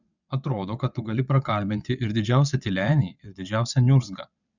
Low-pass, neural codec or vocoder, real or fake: 7.2 kHz; vocoder, 22.05 kHz, 80 mel bands, WaveNeXt; fake